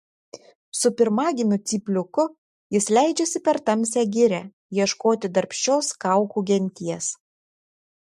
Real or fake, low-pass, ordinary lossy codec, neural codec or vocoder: real; 14.4 kHz; MP3, 64 kbps; none